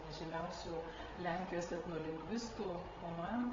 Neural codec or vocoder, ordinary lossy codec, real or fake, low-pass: codec, 16 kHz, 8 kbps, FreqCodec, larger model; AAC, 24 kbps; fake; 7.2 kHz